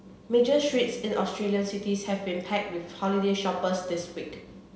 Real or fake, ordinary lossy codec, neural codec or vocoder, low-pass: real; none; none; none